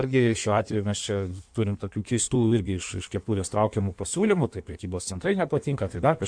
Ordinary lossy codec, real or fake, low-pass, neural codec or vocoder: AAC, 64 kbps; fake; 9.9 kHz; codec, 16 kHz in and 24 kHz out, 1.1 kbps, FireRedTTS-2 codec